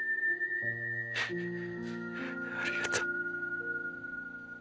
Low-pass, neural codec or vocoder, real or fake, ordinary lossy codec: none; none; real; none